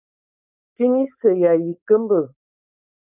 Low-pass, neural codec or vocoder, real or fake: 3.6 kHz; codec, 16 kHz, 4.8 kbps, FACodec; fake